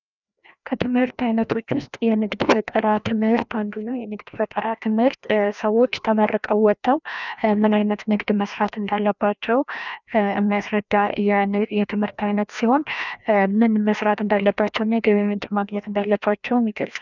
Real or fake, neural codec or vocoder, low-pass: fake; codec, 16 kHz, 1 kbps, FreqCodec, larger model; 7.2 kHz